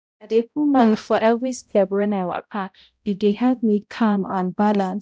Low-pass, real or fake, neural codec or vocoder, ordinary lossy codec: none; fake; codec, 16 kHz, 0.5 kbps, X-Codec, HuBERT features, trained on balanced general audio; none